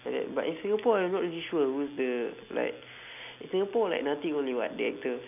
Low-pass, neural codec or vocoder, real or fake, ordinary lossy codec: 3.6 kHz; none; real; none